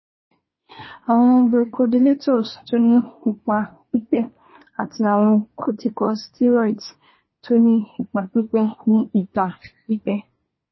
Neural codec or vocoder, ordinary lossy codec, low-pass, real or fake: codec, 24 kHz, 1 kbps, SNAC; MP3, 24 kbps; 7.2 kHz; fake